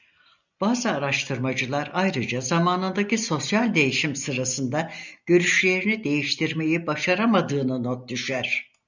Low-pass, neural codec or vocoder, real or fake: 7.2 kHz; none; real